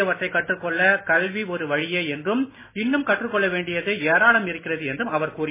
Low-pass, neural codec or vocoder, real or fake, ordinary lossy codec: 3.6 kHz; none; real; MP3, 16 kbps